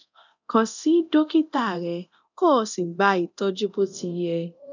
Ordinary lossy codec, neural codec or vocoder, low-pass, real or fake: none; codec, 24 kHz, 0.9 kbps, DualCodec; 7.2 kHz; fake